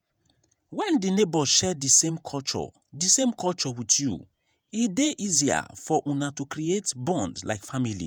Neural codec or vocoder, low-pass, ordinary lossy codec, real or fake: vocoder, 48 kHz, 128 mel bands, Vocos; none; none; fake